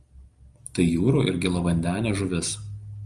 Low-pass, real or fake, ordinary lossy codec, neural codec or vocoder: 10.8 kHz; real; Opus, 32 kbps; none